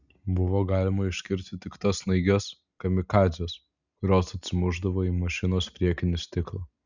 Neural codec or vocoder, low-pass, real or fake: none; 7.2 kHz; real